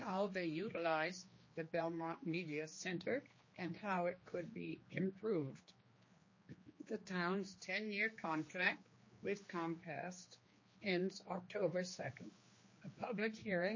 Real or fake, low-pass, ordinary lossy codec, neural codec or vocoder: fake; 7.2 kHz; MP3, 32 kbps; codec, 16 kHz, 2 kbps, X-Codec, HuBERT features, trained on general audio